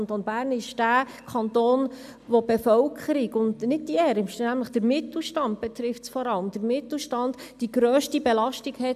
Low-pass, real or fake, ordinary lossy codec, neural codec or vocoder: 14.4 kHz; real; AAC, 96 kbps; none